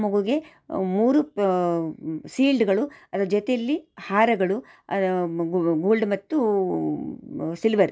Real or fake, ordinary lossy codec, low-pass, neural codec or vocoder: real; none; none; none